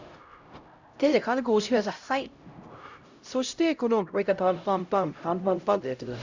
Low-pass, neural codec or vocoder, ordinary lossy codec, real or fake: 7.2 kHz; codec, 16 kHz, 0.5 kbps, X-Codec, HuBERT features, trained on LibriSpeech; Opus, 64 kbps; fake